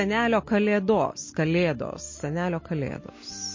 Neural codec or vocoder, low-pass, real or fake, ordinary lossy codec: none; 7.2 kHz; real; MP3, 32 kbps